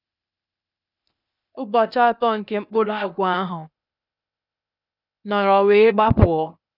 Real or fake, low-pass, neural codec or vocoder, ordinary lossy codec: fake; 5.4 kHz; codec, 16 kHz, 0.8 kbps, ZipCodec; none